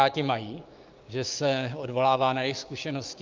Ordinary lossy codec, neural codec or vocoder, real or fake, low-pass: Opus, 24 kbps; codec, 16 kHz, 6 kbps, DAC; fake; 7.2 kHz